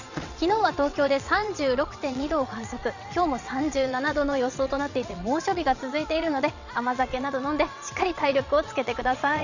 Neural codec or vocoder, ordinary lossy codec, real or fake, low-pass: vocoder, 22.05 kHz, 80 mel bands, WaveNeXt; none; fake; 7.2 kHz